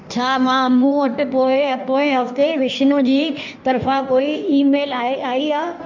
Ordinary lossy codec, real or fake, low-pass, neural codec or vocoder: MP3, 64 kbps; fake; 7.2 kHz; codec, 16 kHz in and 24 kHz out, 1.1 kbps, FireRedTTS-2 codec